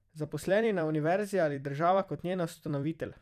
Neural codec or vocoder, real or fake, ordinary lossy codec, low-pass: vocoder, 44.1 kHz, 128 mel bands every 256 samples, BigVGAN v2; fake; none; 14.4 kHz